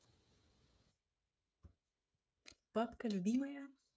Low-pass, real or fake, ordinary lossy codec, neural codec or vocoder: none; fake; none; codec, 16 kHz, 16 kbps, FreqCodec, smaller model